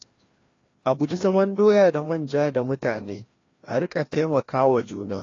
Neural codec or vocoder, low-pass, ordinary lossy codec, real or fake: codec, 16 kHz, 1 kbps, FreqCodec, larger model; 7.2 kHz; AAC, 32 kbps; fake